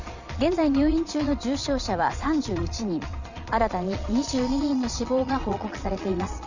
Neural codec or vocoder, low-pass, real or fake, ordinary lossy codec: vocoder, 22.05 kHz, 80 mel bands, Vocos; 7.2 kHz; fake; none